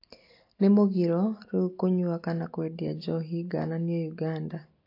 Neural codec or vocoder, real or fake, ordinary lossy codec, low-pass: none; real; AAC, 32 kbps; 5.4 kHz